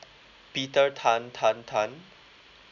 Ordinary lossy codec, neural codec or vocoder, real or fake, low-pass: none; none; real; 7.2 kHz